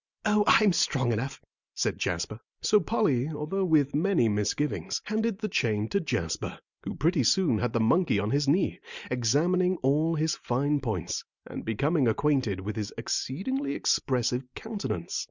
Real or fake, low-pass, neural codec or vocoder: real; 7.2 kHz; none